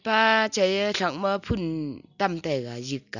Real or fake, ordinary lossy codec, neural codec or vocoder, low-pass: real; none; none; 7.2 kHz